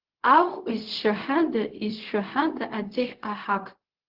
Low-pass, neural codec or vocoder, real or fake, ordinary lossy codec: 5.4 kHz; codec, 16 kHz, 0.4 kbps, LongCat-Audio-Codec; fake; Opus, 16 kbps